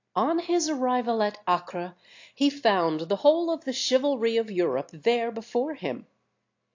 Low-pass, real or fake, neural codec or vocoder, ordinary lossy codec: 7.2 kHz; real; none; AAC, 48 kbps